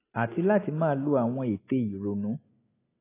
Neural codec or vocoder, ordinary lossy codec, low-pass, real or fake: none; AAC, 24 kbps; 3.6 kHz; real